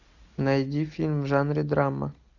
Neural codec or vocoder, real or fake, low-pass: none; real; 7.2 kHz